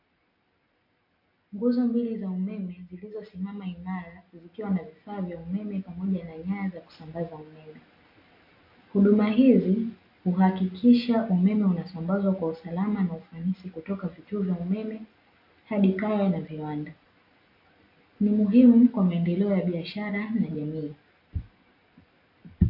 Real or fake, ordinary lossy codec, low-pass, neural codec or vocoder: real; MP3, 32 kbps; 5.4 kHz; none